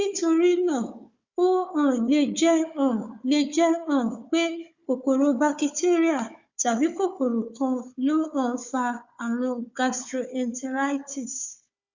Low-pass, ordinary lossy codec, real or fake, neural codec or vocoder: 7.2 kHz; Opus, 64 kbps; fake; codec, 16 kHz, 4 kbps, FunCodec, trained on Chinese and English, 50 frames a second